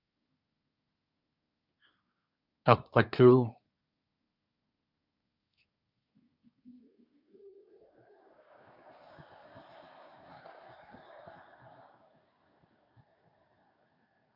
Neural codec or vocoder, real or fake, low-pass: codec, 24 kHz, 1 kbps, SNAC; fake; 5.4 kHz